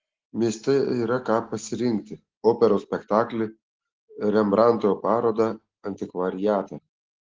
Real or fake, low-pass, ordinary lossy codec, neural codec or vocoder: real; 7.2 kHz; Opus, 16 kbps; none